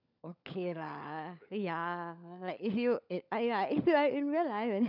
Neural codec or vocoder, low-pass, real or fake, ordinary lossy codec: codec, 16 kHz, 16 kbps, FunCodec, trained on LibriTTS, 50 frames a second; 5.4 kHz; fake; none